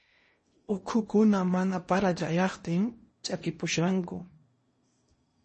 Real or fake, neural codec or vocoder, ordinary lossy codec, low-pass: fake; codec, 16 kHz in and 24 kHz out, 0.8 kbps, FocalCodec, streaming, 65536 codes; MP3, 32 kbps; 10.8 kHz